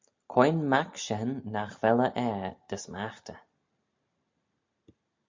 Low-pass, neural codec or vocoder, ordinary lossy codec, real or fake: 7.2 kHz; none; MP3, 64 kbps; real